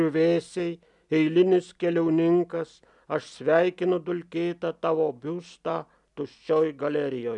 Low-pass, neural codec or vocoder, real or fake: 10.8 kHz; none; real